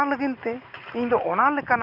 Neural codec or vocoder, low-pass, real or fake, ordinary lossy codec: none; 5.4 kHz; real; none